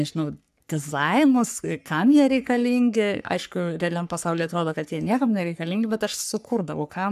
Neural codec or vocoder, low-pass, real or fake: codec, 44.1 kHz, 3.4 kbps, Pupu-Codec; 14.4 kHz; fake